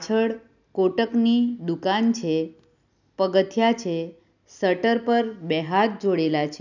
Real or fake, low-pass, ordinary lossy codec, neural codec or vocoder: real; 7.2 kHz; none; none